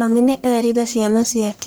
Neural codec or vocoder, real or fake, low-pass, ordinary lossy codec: codec, 44.1 kHz, 1.7 kbps, Pupu-Codec; fake; none; none